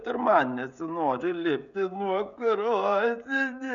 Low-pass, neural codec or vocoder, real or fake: 7.2 kHz; codec, 16 kHz, 16 kbps, FreqCodec, smaller model; fake